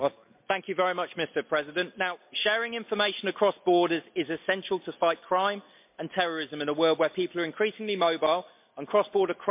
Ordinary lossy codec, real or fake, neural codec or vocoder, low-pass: MP3, 32 kbps; real; none; 3.6 kHz